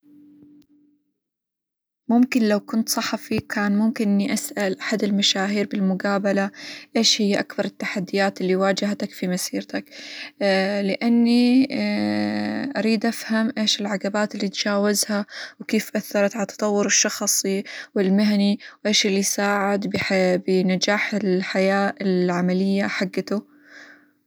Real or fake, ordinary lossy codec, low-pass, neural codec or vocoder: real; none; none; none